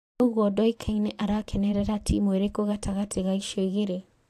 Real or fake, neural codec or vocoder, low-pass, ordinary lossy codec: fake; vocoder, 44.1 kHz, 128 mel bands every 512 samples, BigVGAN v2; 14.4 kHz; AAC, 64 kbps